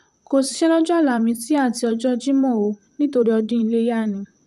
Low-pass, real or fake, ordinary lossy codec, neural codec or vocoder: 14.4 kHz; fake; none; vocoder, 44.1 kHz, 128 mel bands, Pupu-Vocoder